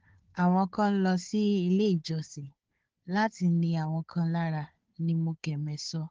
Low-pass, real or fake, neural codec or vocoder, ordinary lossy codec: 7.2 kHz; fake; codec, 16 kHz, 4 kbps, FunCodec, trained on Chinese and English, 50 frames a second; Opus, 16 kbps